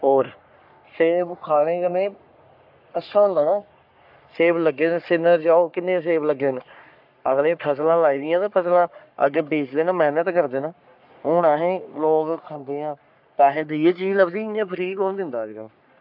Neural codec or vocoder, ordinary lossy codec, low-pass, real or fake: codec, 44.1 kHz, 3.4 kbps, Pupu-Codec; AAC, 48 kbps; 5.4 kHz; fake